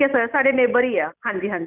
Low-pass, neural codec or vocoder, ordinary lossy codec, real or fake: 3.6 kHz; none; none; real